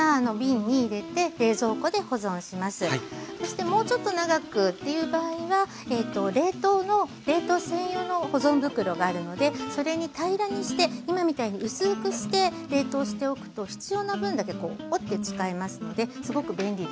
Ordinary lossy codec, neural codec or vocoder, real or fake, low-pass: none; none; real; none